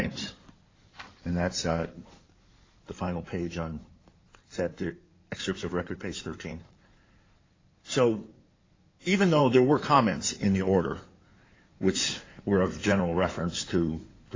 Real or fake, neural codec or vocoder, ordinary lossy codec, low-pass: fake; codec, 16 kHz in and 24 kHz out, 2.2 kbps, FireRedTTS-2 codec; AAC, 32 kbps; 7.2 kHz